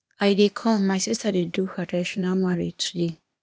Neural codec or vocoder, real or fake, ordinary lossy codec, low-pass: codec, 16 kHz, 0.8 kbps, ZipCodec; fake; none; none